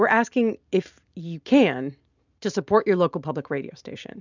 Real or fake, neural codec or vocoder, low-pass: real; none; 7.2 kHz